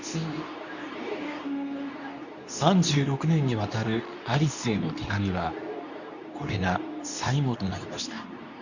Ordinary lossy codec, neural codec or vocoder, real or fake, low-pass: none; codec, 24 kHz, 0.9 kbps, WavTokenizer, medium speech release version 2; fake; 7.2 kHz